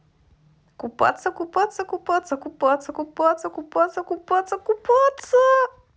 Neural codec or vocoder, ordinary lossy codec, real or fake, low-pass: none; none; real; none